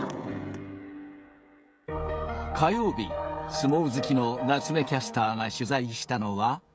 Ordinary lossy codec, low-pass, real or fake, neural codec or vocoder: none; none; fake; codec, 16 kHz, 16 kbps, FreqCodec, smaller model